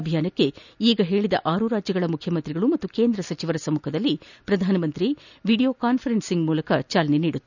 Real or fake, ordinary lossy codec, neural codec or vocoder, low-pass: real; none; none; 7.2 kHz